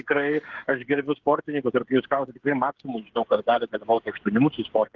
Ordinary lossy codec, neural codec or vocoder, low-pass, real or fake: Opus, 16 kbps; codec, 16 kHz, 8 kbps, FreqCodec, smaller model; 7.2 kHz; fake